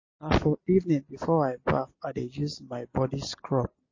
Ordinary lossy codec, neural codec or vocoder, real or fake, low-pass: MP3, 32 kbps; none; real; 7.2 kHz